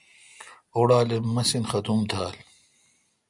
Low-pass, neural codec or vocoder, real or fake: 10.8 kHz; none; real